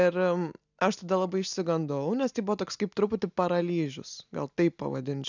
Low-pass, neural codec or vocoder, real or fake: 7.2 kHz; none; real